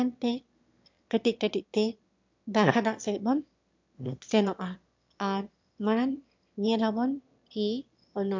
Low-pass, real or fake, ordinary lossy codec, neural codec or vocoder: 7.2 kHz; fake; MP3, 64 kbps; autoencoder, 22.05 kHz, a latent of 192 numbers a frame, VITS, trained on one speaker